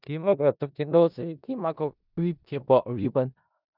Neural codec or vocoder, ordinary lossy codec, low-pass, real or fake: codec, 16 kHz in and 24 kHz out, 0.4 kbps, LongCat-Audio-Codec, four codebook decoder; none; 5.4 kHz; fake